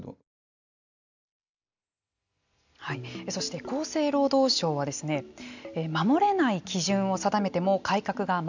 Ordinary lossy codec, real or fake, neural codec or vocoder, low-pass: none; real; none; 7.2 kHz